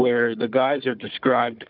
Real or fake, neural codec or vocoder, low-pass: fake; codec, 16 kHz, 4 kbps, FunCodec, trained on Chinese and English, 50 frames a second; 5.4 kHz